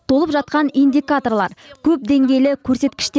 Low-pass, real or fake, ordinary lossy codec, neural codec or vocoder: none; real; none; none